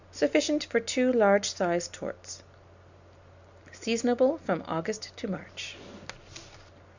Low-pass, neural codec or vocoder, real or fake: 7.2 kHz; none; real